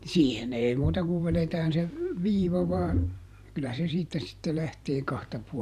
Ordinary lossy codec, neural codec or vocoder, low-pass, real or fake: none; vocoder, 44.1 kHz, 128 mel bands every 512 samples, BigVGAN v2; 14.4 kHz; fake